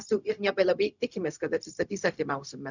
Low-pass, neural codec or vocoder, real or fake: 7.2 kHz; codec, 16 kHz, 0.4 kbps, LongCat-Audio-Codec; fake